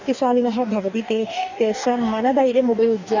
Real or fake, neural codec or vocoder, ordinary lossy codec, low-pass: fake; codec, 44.1 kHz, 2.6 kbps, DAC; none; 7.2 kHz